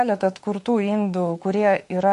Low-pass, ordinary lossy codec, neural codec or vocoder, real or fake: 14.4 kHz; MP3, 48 kbps; autoencoder, 48 kHz, 32 numbers a frame, DAC-VAE, trained on Japanese speech; fake